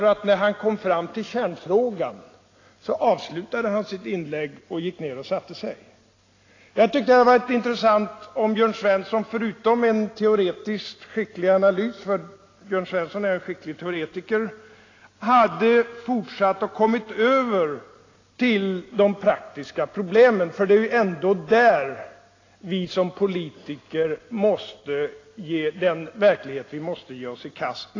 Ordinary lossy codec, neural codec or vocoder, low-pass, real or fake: AAC, 32 kbps; none; 7.2 kHz; real